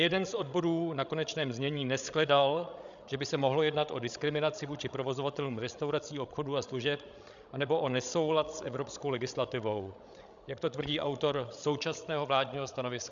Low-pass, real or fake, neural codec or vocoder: 7.2 kHz; fake; codec, 16 kHz, 16 kbps, FreqCodec, larger model